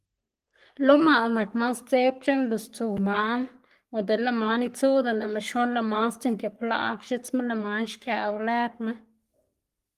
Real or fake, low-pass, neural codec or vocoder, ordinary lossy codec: fake; 14.4 kHz; codec, 44.1 kHz, 3.4 kbps, Pupu-Codec; Opus, 24 kbps